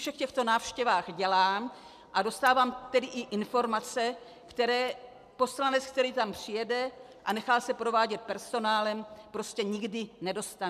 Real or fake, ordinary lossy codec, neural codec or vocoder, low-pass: real; MP3, 96 kbps; none; 14.4 kHz